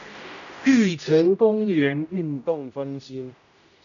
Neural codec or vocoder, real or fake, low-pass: codec, 16 kHz, 0.5 kbps, X-Codec, HuBERT features, trained on general audio; fake; 7.2 kHz